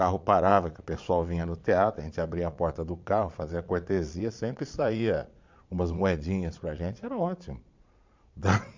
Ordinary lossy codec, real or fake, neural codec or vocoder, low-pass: MP3, 64 kbps; fake; vocoder, 22.05 kHz, 80 mel bands, Vocos; 7.2 kHz